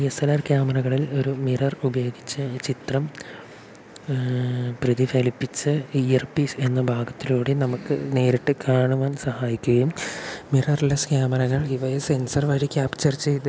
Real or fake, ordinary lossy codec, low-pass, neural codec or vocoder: real; none; none; none